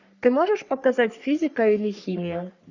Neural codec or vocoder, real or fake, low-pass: codec, 44.1 kHz, 3.4 kbps, Pupu-Codec; fake; 7.2 kHz